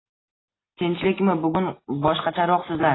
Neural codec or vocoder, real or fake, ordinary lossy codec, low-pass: none; real; AAC, 16 kbps; 7.2 kHz